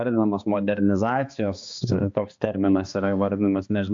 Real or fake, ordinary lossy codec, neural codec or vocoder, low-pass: fake; AAC, 64 kbps; codec, 16 kHz, 2 kbps, X-Codec, HuBERT features, trained on balanced general audio; 7.2 kHz